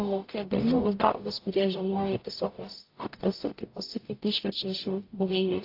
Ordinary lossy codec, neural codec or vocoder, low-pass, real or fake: AAC, 32 kbps; codec, 44.1 kHz, 0.9 kbps, DAC; 5.4 kHz; fake